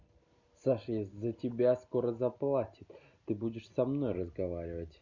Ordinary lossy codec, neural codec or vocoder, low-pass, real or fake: none; none; 7.2 kHz; real